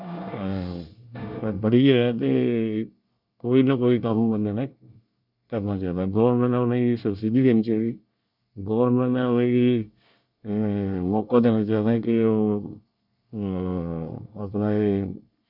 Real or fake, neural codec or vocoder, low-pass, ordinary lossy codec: fake; codec, 24 kHz, 1 kbps, SNAC; 5.4 kHz; none